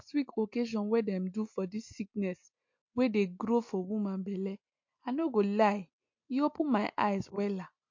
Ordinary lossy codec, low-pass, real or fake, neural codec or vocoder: MP3, 48 kbps; 7.2 kHz; real; none